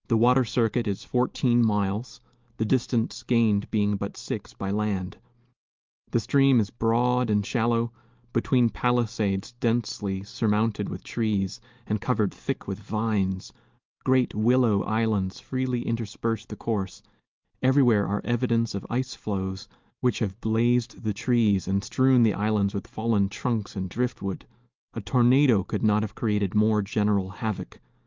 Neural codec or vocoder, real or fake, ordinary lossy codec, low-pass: none; real; Opus, 24 kbps; 7.2 kHz